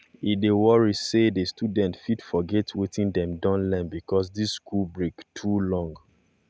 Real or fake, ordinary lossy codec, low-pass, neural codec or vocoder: real; none; none; none